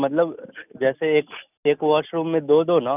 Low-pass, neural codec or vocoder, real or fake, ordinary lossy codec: 3.6 kHz; none; real; none